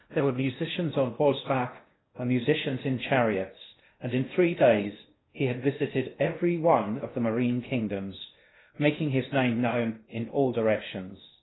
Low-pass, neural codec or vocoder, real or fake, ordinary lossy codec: 7.2 kHz; codec, 16 kHz in and 24 kHz out, 0.6 kbps, FocalCodec, streaming, 2048 codes; fake; AAC, 16 kbps